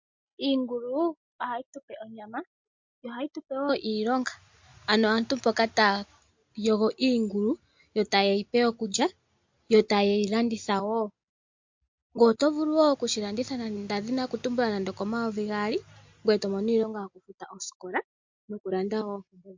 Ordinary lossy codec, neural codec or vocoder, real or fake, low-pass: MP3, 48 kbps; none; real; 7.2 kHz